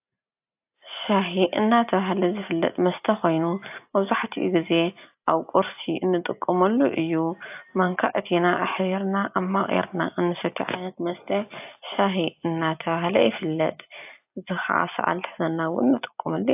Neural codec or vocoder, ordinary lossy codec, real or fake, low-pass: none; AAC, 32 kbps; real; 3.6 kHz